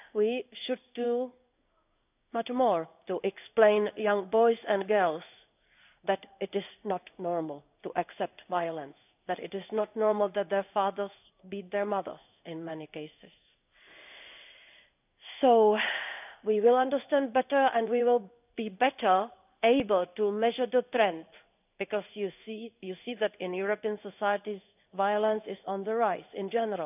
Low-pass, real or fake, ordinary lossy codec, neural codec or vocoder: 3.6 kHz; fake; none; codec, 16 kHz in and 24 kHz out, 1 kbps, XY-Tokenizer